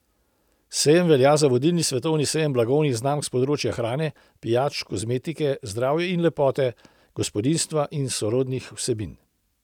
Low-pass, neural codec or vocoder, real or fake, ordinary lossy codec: 19.8 kHz; none; real; none